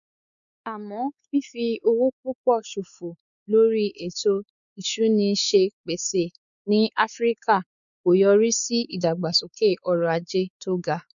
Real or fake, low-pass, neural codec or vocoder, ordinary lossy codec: fake; 7.2 kHz; codec, 16 kHz, 4 kbps, X-Codec, WavLM features, trained on Multilingual LibriSpeech; none